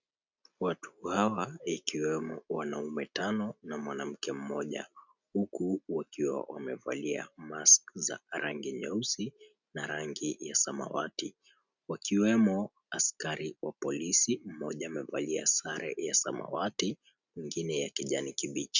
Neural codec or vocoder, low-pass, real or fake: none; 7.2 kHz; real